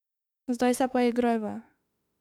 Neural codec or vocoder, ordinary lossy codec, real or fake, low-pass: autoencoder, 48 kHz, 32 numbers a frame, DAC-VAE, trained on Japanese speech; none; fake; 19.8 kHz